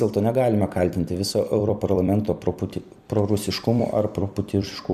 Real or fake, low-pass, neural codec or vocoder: fake; 14.4 kHz; vocoder, 44.1 kHz, 128 mel bands every 256 samples, BigVGAN v2